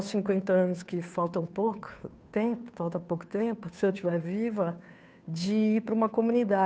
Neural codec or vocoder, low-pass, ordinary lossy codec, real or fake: codec, 16 kHz, 2 kbps, FunCodec, trained on Chinese and English, 25 frames a second; none; none; fake